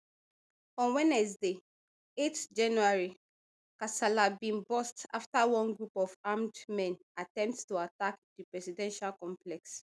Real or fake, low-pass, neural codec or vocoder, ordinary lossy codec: real; none; none; none